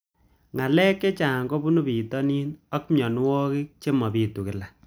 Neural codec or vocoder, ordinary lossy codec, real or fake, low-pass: none; none; real; none